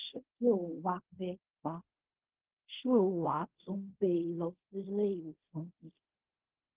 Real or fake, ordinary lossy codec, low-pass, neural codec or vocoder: fake; Opus, 16 kbps; 3.6 kHz; codec, 16 kHz in and 24 kHz out, 0.4 kbps, LongCat-Audio-Codec, fine tuned four codebook decoder